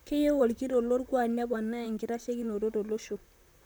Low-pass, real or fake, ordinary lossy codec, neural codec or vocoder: none; fake; none; vocoder, 44.1 kHz, 128 mel bands, Pupu-Vocoder